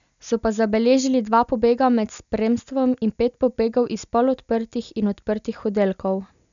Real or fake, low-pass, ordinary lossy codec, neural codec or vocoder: real; 7.2 kHz; none; none